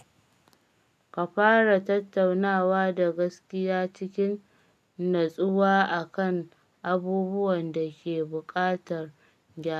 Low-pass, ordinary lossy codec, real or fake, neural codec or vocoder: 14.4 kHz; none; real; none